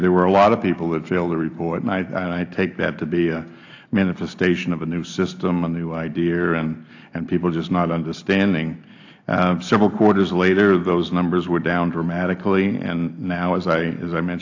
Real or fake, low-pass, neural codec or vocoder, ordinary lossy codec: real; 7.2 kHz; none; AAC, 48 kbps